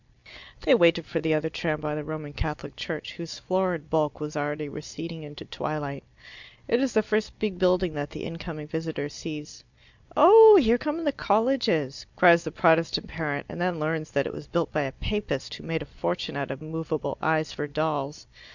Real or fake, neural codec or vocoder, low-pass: real; none; 7.2 kHz